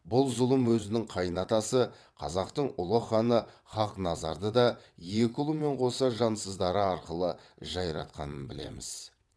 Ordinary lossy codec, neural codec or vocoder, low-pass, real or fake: none; vocoder, 22.05 kHz, 80 mel bands, WaveNeXt; none; fake